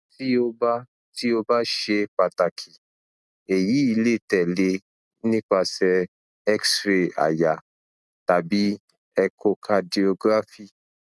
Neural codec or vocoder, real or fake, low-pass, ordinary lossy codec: none; real; none; none